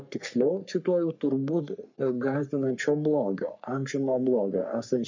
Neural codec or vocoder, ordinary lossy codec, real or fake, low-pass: codec, 44.1 kHz, 3.4 kbps, Pupu-Codec; MP3, 64 kbps; fake; 7.2 kHz